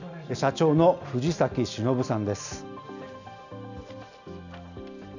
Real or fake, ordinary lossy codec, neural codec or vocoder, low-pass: real; none; none; 7.2 kHz